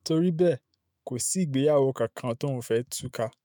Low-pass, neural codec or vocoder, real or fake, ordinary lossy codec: none; autoencoder, 48 kHz, 128 numbers a frame, DAC-VAE, trained on Japanese speech; fake; none